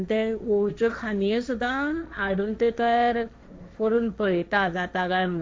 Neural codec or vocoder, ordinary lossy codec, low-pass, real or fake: codec, 16 kHz, 1.1 kbps, Voila-Tokenizer; none; none; fake